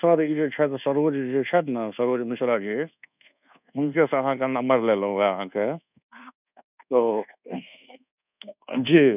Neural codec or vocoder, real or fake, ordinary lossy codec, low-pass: codec, 24 kHz, 1.2 kbps, DualCodec; fake; none; 3.6 kHz